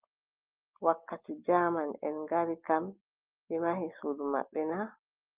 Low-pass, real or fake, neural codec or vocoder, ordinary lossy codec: 3.6 kHz; real; none; Opus, 24 kbps